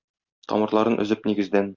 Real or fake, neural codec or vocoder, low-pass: real; none; 7.2 kHz